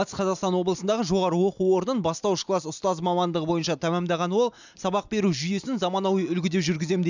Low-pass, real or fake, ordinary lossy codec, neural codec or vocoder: 7.2 kHz; real; none; none